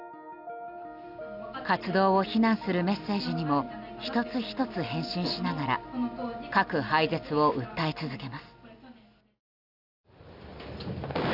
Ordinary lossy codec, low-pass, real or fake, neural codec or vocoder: none; 5.4 kHz; real; none